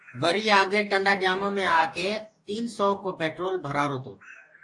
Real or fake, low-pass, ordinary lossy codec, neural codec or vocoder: fake; 10.8 kHz; AAC, 64 kbps; codec, 44.1 kHz, 2.6 kbps, DAC